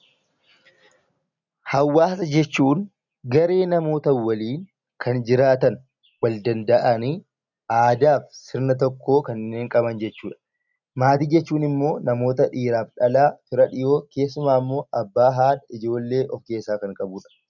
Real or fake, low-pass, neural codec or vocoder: real; 7.2 kHz; none